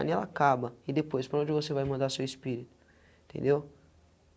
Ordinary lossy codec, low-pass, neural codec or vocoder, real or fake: none; none; none; real